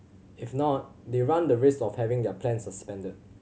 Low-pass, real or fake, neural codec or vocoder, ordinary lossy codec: none; real; none; none